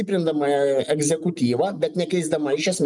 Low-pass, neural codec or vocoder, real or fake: 10.8 kHz; none; real